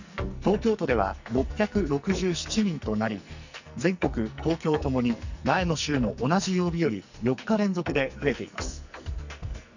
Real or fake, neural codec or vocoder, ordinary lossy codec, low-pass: fake; codec, 44.1 kHz, 2.6 kbps, SNAC; none; 7.2 kHz